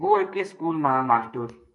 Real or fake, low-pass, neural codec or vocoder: fake; 10.8 kHz; codec, 44.1 kHz, 2.6 kbps, SNAC